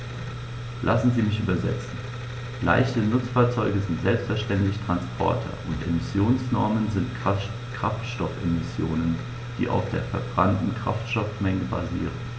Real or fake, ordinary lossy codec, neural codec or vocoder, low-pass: real; none; none; none